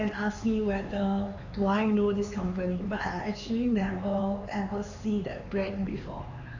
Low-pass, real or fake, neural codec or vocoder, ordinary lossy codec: 7.2 kHz; fake; codec, 16 kHz, 4 kbps, X-Codec, HuBERT features, trained on LibriSpeech; AAC, 48 kbps